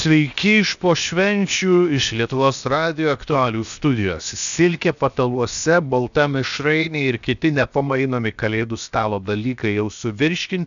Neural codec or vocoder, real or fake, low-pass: codec, 16 kHz, 0.7 kbps, FocalCodec; fake; 7.2 kHz